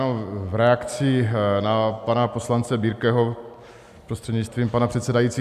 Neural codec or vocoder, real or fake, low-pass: none; real; 14.4 kHz